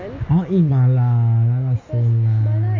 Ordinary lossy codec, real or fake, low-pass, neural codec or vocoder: MP3, 32 kbps; real; 7.2 kHz; none